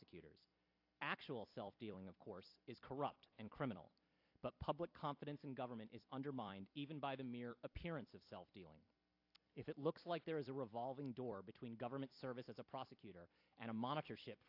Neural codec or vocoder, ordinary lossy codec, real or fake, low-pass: vocoder, 44.1 kHz, 128 mel bands every 256 samples, BigVGAN v2; AAC, 48 kbps; fake; 5.4 kHz